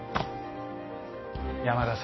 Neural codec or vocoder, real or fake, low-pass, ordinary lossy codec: none; real; 7.2 kHz; MP3, 24 kbps